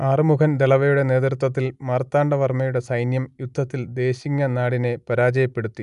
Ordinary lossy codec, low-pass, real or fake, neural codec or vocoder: none; 10.8 kHz; real; none